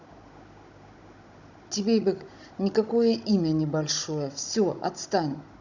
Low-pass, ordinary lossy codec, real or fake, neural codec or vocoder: 7.2 kHz; none; fake; codec, 16 kHz, 16 kbps, FunCodec, trained on Chinese and English, 50 frames a second